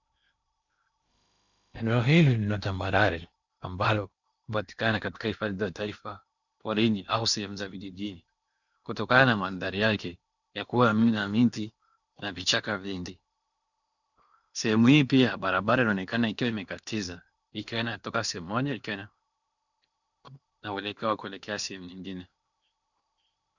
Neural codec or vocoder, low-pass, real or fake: codec, 16 kHz in and 24 kHz out, 0.8 kbps, FocalCodec, streaming, 65536 codes; 7.2 kHz; fake